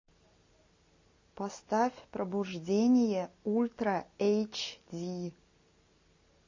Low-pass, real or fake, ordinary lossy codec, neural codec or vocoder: 7.2 kHz; real; MP3, 32 kbps; none